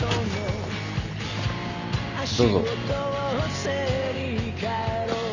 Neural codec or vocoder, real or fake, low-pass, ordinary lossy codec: none; real; 7.2 kHz; none